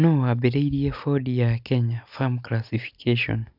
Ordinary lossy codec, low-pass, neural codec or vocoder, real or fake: none; 5.4 kHz; none; real